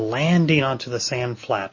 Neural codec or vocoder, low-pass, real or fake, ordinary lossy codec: none; 7.2 kHz; real; MP3, 32 kbps